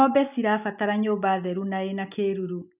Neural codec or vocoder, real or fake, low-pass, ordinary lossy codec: none; real; 3.6 kHz; none